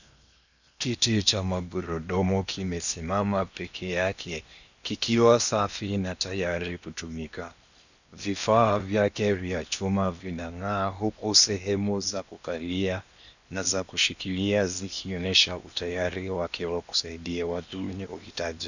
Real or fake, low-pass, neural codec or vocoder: fake; 7.2 kHz; codec, 16 kHz in and 24 kHz out, 0.8 kbps, FocalCodec, streaming, 65536 codes